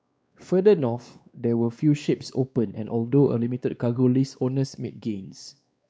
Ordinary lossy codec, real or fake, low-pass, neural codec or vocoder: none; fake; none; codec, 16 kHz, 2 kbps, X-Codec, WavLM features, trained on Multilingual LibriSpeech